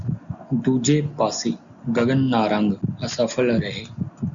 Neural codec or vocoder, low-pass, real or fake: none; 7.2 kHz; real